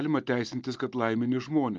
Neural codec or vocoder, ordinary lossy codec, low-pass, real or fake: none; Opus, 32 kbps; 7.2 kHz; real